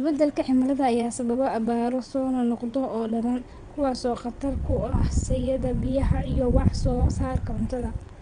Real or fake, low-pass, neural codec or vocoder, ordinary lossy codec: fake; 9.9 kHz; vocoder, 22.05 kHz, 80 mel bands, WaveNeXt; Opus, 64 kbps